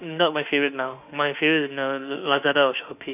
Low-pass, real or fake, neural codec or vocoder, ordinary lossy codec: 3.6 kHz; fake; autoencoder, 48 kHz, 32 numbers a frame, DAC-VAE, trained on Japanese speech; none